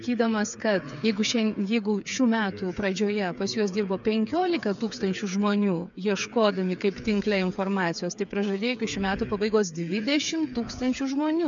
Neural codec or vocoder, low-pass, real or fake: codec, 16 kHz, 8 kbps, FreqCodec, smaller model; 7.2 kHz; fake